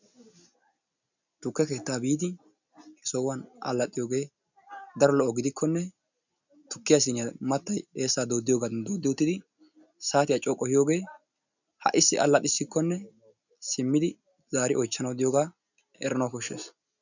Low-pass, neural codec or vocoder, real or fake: 7.2 kHz; none; real